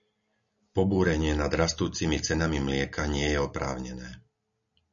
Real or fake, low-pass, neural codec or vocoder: real; 7.2 kHz; none